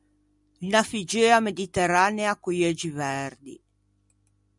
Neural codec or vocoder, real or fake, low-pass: none; real; 10.8 kHz